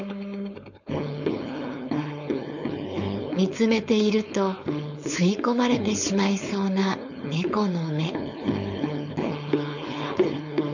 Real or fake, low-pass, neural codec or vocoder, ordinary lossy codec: fake; 7.2 kHz; codec, 16 kHz, 4.8 kbps, FACodec; none